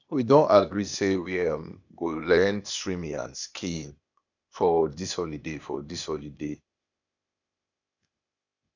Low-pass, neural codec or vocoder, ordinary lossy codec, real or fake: 7.2 kHz; codec, 16 kHz, 0.8 kbps, ZipCodec; none; fake